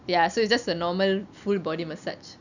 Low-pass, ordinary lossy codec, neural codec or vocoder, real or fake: 7.2 kHz; none; none; real